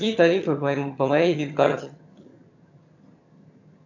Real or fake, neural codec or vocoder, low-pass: fake; vocoder, 22.05 kHz, 80 mel bands, HiFi-GAN; 7.2 kHz